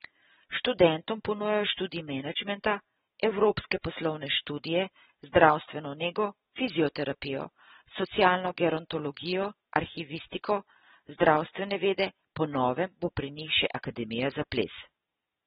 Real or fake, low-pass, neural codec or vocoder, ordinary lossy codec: real; 19.8 kHz; none; AAC, 16 kbps